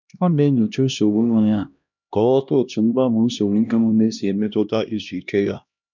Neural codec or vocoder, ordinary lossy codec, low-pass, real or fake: codec, 16 kHz, 1 kbps, X-Codec, HuBERT features, trained on LibriSpeech; none; 7.2 kHz; fake